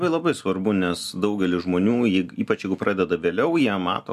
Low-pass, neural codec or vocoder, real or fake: 14.4 kHz; none; real